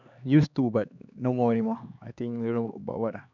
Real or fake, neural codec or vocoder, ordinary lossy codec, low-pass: fake; codec, 16 kHz, 2 kbps, X-Codec, HuBERT features, trained on LibriSpeech; none; 7.2 kHz